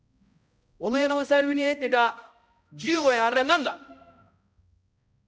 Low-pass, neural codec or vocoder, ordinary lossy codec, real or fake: none; codec, 16 kHz, 0.5 kbps, X-Codec, HuBERT features, trained on balanced general audio; none; fake